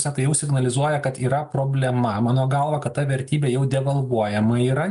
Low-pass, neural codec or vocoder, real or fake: 10.8 kHz; none; real